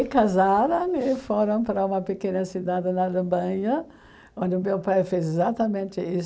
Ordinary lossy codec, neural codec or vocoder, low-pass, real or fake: none; none; none; real